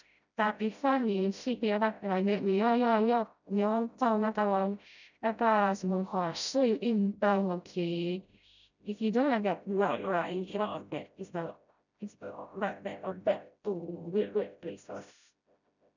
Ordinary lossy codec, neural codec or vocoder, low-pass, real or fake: none; codec, 16 kHz, 0.5 kbps, FreqCodec, smaller model; 7.2 kHz; fake